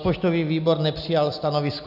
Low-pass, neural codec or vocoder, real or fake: 5.4 kHz; none; real